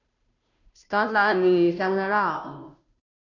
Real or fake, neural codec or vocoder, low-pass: fake; codec, 16 kHz, 0.5 kbps, FunCodec, trained on Chinese and English, 25 frames a second; 7.2 kHz